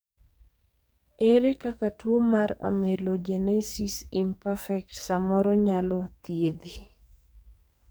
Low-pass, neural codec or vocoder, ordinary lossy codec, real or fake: none; codec, 44.1 kHz, 2.6 kbps, SNAC; none; fake